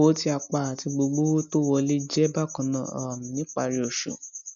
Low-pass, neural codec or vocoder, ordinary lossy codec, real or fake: 7.2 kHz; none; none; real